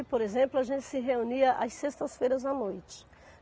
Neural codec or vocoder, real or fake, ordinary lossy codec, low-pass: none; real; none; none